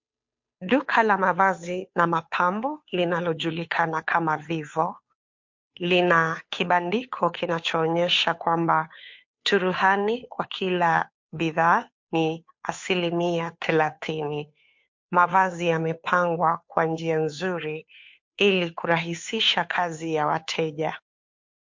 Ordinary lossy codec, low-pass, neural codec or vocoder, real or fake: MP3, 48 kbps; 7.2 kHz; codec, 16 kHz, 2 kbps, FunCodec, trained on Chinese and English, 25 frames a second; fake